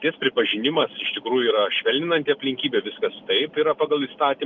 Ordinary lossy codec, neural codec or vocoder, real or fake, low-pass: Opus, 24 kbps; none; real; 7.2 kHz